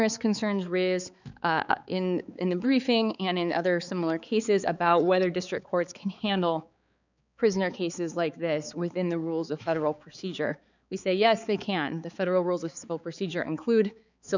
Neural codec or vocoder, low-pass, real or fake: codec, 16 kHz, 4 kbps, X-Codec, HuBERT features, trained on balanced general audio; 7.2 kHz; fake